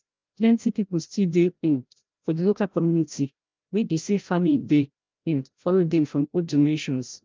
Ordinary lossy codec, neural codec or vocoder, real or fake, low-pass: Opus, 24 kbps; codec, 16 kHz, 0.5 kbps, FreqCodec, larger model; fake; 7.2 kHz